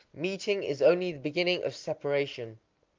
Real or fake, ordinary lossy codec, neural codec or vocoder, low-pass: real; Opus, 32 kbps; none; 7.2 kHz